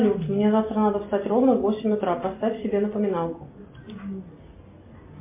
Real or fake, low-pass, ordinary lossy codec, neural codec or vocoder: real; 3.6 kHz; MP3, 24 kbps; none